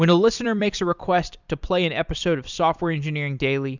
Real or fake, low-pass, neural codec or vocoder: fake; 7.2 kHz; vocoder, 44.1 kHz, 128 mel bands every 256 samples, BigVGAN v2